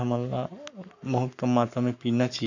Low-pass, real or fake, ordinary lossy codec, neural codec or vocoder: 7.2 kHz; fake; none; codec, 44.1 kHz, 7.8 kbps, Pupu-Codec